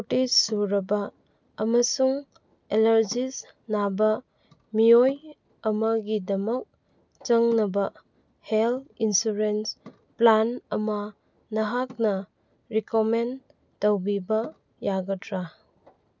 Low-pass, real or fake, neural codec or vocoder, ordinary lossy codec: 7.2 kHz; real; none; none